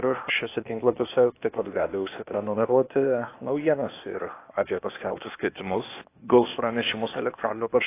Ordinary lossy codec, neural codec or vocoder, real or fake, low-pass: AAC, 24 kbps; codec, 16 kHz, 0.8 kbps, ZipCodec; fake; 3.6 kHz